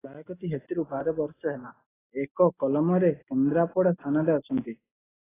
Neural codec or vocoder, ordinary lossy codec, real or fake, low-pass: none; AAC, 16 kbps; real; 3.6 kHz